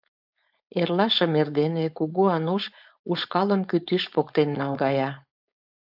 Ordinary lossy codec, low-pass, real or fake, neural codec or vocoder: AAC, 48 kbps; 5.4 kHz; fake; codec, 16 kHz, 4.8 kbps, FACodec